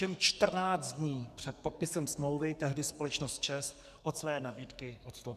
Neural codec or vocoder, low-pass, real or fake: codec, 44.1 kHz, 2.6 kbps, SNAC; 14.4 kHz; fake